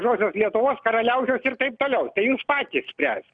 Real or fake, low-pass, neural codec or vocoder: real; 9.9 kHz; none